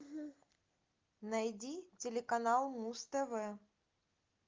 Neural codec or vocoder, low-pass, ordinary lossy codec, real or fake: none; 7.2 kHz; Opus, 24 kbps; real